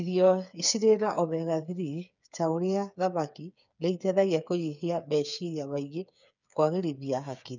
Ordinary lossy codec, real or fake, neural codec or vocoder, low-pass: none; fake; codec, 16 kHz, 8 kbps, FreqCodec, smaller model; 7.2 kHz